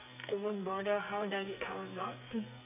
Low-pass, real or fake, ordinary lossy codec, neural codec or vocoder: 3.6 kHz; fake; none; codec, 44.1 kHz, 2.6 kbps, SNAC